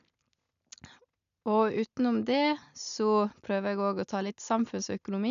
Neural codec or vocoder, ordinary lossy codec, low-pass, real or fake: none; none; 7.2 kHz; real